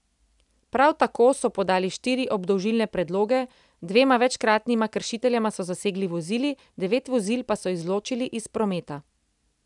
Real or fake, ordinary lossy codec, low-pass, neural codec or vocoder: real; none; 10.8 kHz; none